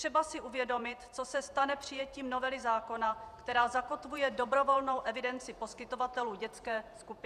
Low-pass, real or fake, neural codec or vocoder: 14.4 kHz; fake; vocoder, 48 kHz, 128 mel bands, Vocos